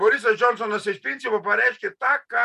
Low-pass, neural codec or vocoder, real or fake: 14.4 kHz; none; real